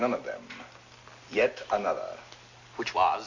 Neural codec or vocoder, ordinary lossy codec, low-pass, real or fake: none; AAC, 48 kbps; 7.2 kHz; real